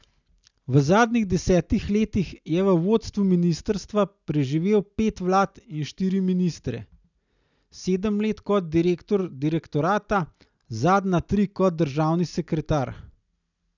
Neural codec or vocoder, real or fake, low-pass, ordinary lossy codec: none; real; 7.2 kHz; none